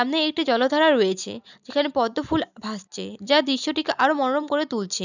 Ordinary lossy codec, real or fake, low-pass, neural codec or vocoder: none; real; 7.2 kHz; none